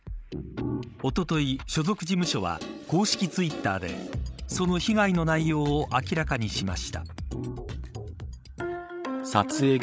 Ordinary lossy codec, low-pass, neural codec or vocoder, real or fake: none; none; codec, 16 kHz, 16 kbps, FreqCodec, larger model; fake